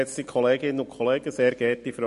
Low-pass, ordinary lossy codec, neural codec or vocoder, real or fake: 14.4 kHz; MP3, 48 kbps; none; real